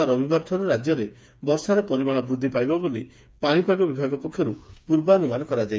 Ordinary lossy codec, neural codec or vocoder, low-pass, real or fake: none; codec, 16 kHz, 4 kbps, FreqCodec, smaller model; none; fake